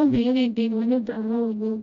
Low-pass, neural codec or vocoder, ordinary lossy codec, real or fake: 7.2 kHz; codec, 16 kHz, 0.5 kbps, FreqCodec, smaller model; none; fake